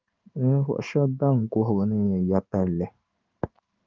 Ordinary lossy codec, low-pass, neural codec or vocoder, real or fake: Opus, 24 kbps; 7.2 kHz; codec, 16 kHz in and 24 kHz out, 1 kbps, XY-Tokenizer; fake